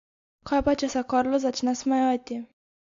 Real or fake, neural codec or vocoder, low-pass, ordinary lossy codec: real; none; 7.2 kHz; AAC, 48 kbps